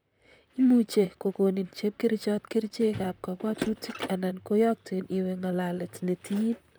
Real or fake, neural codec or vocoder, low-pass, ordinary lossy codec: fake; vocoder, 44.1 kHz, 128 mel bands, Pupu-Vocoder; none; none